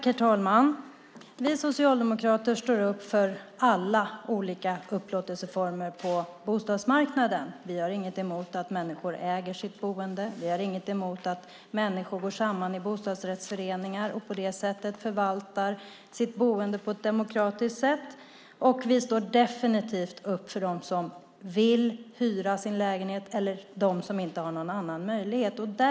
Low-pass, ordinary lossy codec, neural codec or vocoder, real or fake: none; none; none; real